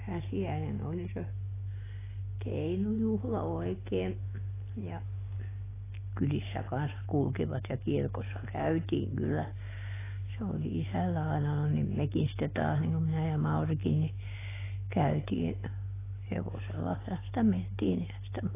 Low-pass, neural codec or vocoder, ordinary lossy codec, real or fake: 3.6 kHz; none; AAC, 16 kbps; real